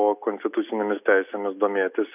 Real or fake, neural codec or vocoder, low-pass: real; none; 3.6 kHz